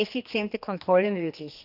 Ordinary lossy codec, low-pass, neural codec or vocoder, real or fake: none; 5.4 kHz; codec, 16 kHz, 2 kbps, X-Codec, HuBERT features, trained on general audio; fake